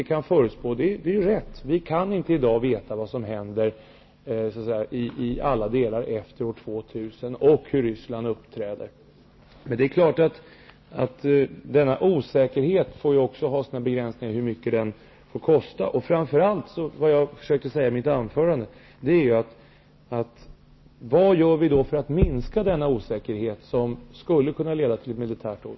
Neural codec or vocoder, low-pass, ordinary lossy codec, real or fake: none; 7.2 kHz; MP3, 24 kbps; real